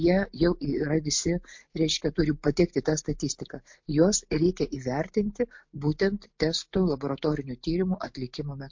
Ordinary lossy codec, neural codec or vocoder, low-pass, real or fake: MP3, 48 kbps; none; 7.2 kHz; real